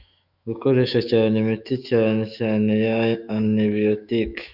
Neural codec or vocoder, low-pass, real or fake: autoencoder, 48 kHz, 128 numbers a frame, DAC-VAE, trained on Japanese speech; 5.4 kHz; fake